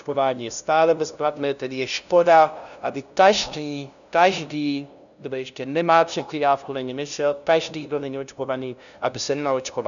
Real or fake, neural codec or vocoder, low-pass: fake; codec, 16 kHz, 0.5 kbps, FunCodec, trained on LibriTTS, 25 frames a second; 7.2 kHz